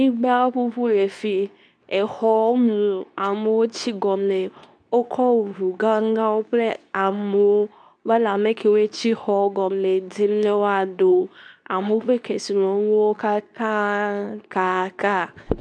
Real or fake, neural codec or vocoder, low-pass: fake; codec, 24 kHz, 0.9 kbps, WavTokenizer, medium speech release version 2; 9.9 kHz